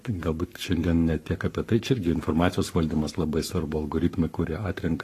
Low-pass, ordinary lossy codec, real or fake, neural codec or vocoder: 14.4 kHz; AAC, 48 kbps; fake; codec, 44.1 kHz, 7.8 kbps, Pupu-Codec